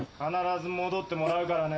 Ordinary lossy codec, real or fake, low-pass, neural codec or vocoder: none; real; none; none